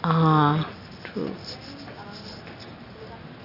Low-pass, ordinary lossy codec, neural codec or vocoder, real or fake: 5.4 kHz; AAC, 32 kbps; none; real